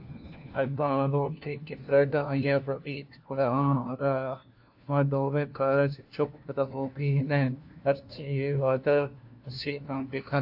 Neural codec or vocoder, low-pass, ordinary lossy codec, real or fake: codec, 16 kHz, 1 kbps, FunCodec, trained on LibriTTS, 50 frames a second; 5.4 kHz; AAC, 48 kbps; fake